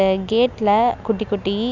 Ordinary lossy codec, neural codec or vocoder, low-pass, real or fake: none; none; 7.2 kHz; real